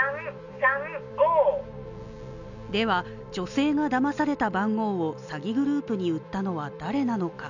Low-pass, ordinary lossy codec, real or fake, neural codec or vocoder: 7.2 kHz; none; real; none